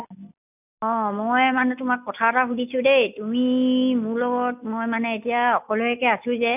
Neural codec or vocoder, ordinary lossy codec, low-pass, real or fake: none; none; 3.6 kHz; real